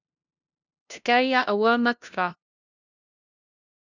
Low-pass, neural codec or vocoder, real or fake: 7.2 kHz; codec, 16 kHz, 0.5 kbps, FunCodec, trained on LibriTTS, 25 frames a second; fake